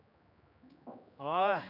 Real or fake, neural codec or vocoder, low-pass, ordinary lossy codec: fake; codec, 16 kHz, 2 kbps, X-Codec, HuBERT features, trained on general audio; 5.4 kHz; none